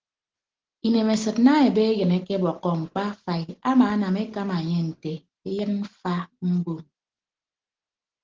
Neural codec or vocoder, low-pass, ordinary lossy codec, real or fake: none; 7.2 kHz; Opus, 16 kbps; real